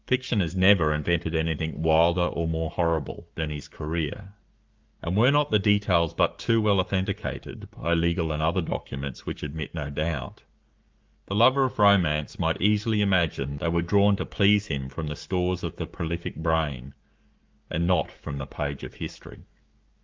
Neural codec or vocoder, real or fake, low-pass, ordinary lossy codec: codec, 44.1 kHz, 7.8 kbps, Pupu-Codec; fake; 7.2 kHz; Opus, 24 kbps